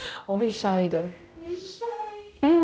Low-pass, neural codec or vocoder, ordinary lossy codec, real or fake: none; codec, 16 kHz, 0.5 kbps, X-Codec, HuBERT features, trained on general audio; none; fake